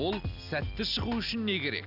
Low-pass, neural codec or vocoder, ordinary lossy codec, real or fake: 5.4 kHz; none; none; real